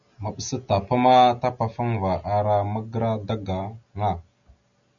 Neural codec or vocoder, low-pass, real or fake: none; 7.2 kHz; real